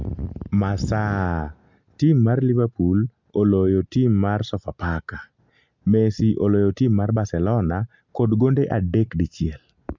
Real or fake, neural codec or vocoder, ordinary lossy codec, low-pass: real; none; MP3, 64 kbps; 7.2 kHz